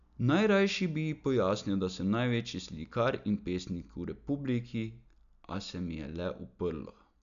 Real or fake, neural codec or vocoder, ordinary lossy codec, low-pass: real; none; none; 7.2 kHz